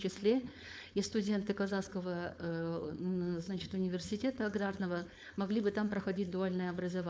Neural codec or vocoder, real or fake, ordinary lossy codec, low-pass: codec, 16 kHz, 4.8 kbps, FACodec; fake; none; none